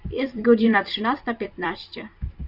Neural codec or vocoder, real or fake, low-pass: vocoder, 44.1 kHz, 128 mel bands every 256 samples, BigVGAN v2; fake; 5.4 kHz